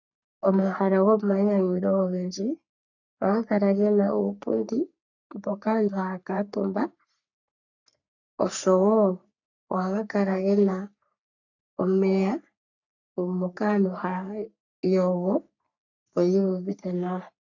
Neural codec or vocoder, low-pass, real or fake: codec, 44.1 kHz, 3.4 kbps, Pupu-Codec; 7.2 kHz; fake